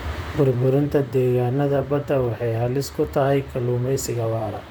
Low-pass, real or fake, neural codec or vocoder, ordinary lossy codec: none; fake; vocoder, 44.1 kHz, 128 mel bands, Pupu-Vocoder; none